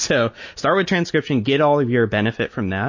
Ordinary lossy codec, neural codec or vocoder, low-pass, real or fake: MP3, 32 kbps; none; 7.2 kHz; real